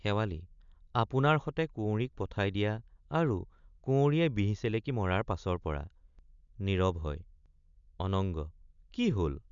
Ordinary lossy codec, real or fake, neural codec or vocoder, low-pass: none; real; none; 7.2 kHz